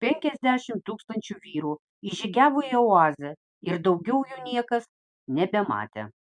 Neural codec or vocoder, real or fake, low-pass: none; real; 9.9 kHz